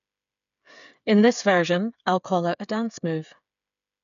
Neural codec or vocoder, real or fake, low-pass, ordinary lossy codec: codec, 16 kHz, 8 kbps, FreqCodec, smaller model; fake; 7.2 kHz; none